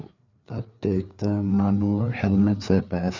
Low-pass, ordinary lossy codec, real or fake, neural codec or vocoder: 7.2 kHz; none; fake; codec, 16 kHz, 4 kbps, FreqCodec, larger model